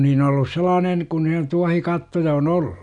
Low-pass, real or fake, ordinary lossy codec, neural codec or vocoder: 10.8 kHz; real; MP3, 96 kbps; none